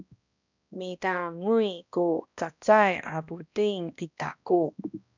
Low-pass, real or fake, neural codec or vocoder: 7.2 kHz; fake; codec, 16 kHz, 1 kbps, X-Codec, HuBERT features, trained on balanced general audio